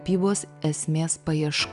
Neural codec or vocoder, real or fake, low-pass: none; real; 10.8 kHz